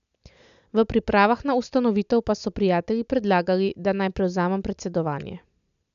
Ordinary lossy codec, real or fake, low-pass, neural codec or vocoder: none; real; 7.2 kHz; none